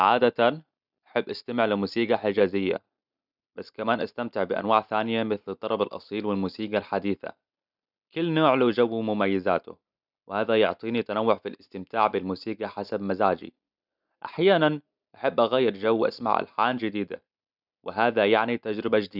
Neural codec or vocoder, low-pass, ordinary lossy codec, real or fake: none; 5.4 kHz; none; real